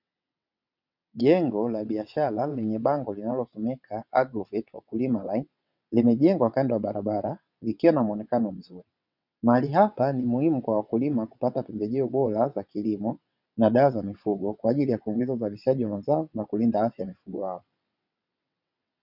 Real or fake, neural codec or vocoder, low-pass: fake; vocoder, 22.05 kHz, 80 mel bands, WaveNeXt; 5.4 kHz